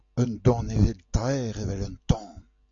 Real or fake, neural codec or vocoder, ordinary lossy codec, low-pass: real; none; AAC, 48 kbps; 7.2 kHz